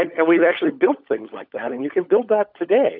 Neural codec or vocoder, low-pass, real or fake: codec, 16 kHz, 16 kbps, FunCodec, trained on LibriTTS, 50 frames a second; 5.4 kHz; fake